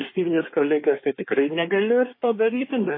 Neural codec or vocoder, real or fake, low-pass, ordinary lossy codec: codec, 24 kHz, 1 kbps, SNAC; fake; 5.4 kHz; MP3, 24 kbps